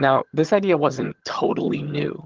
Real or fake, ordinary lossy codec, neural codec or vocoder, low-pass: fake; Opus, 16 kbps; vocoder, 22.05 kHz, 80 mel bands, HiFi-GAN; 7.2 kHz